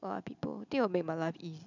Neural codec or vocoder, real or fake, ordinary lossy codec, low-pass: none; real; none; 7.2 kHz